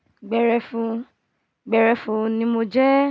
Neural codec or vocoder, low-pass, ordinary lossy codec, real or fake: none; none; none; real